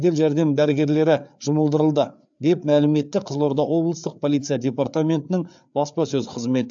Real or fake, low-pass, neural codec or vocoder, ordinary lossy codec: fake; 7.2 kHz; codec, 16 kHz, 4 kbps, FreqCodec, larger model; AAC, 64 kbps